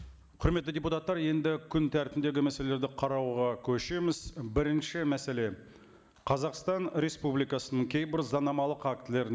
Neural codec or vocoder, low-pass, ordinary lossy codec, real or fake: none; none; none; real